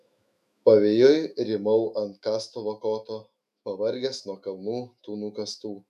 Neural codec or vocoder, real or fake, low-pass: autoencoder, 48 kHz, 128 numbers a frame, DAC-VAE, trained on Japanese speech; fake; 14.4 kHz